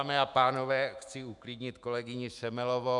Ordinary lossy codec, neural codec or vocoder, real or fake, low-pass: MP3, 96 kbps; autoencoder, 48 kHz, 128 numbers a frame, DAC-VAE, trained on Japanese speech; fake; 10.8 kHz